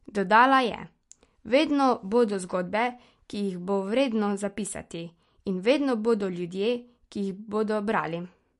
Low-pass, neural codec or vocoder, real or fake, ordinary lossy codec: 14.4 kHz; none; real; MP3, 48 kbps